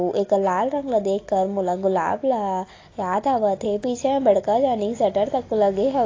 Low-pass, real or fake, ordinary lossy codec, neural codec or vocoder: 7.2 kHz; real; AAC, 32 kbps; none